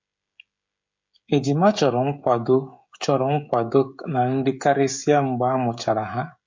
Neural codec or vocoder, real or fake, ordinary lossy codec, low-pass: codec, 16 kHz, 16 kbps, FreqCodec, smaller model; fake; MP3, 48 kbps; 7.2 kHz